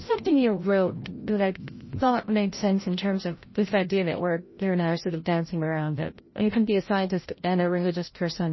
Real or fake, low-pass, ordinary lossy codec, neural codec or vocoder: fake; 7.2 kHz; MP3, 24 kbps; codec, 16 kHz, 0.5 kbps, FreqCodec, larger model